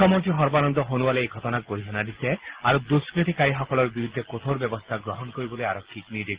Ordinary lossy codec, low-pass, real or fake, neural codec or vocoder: Opus, 16 kbps; 3.6 kHz; real; none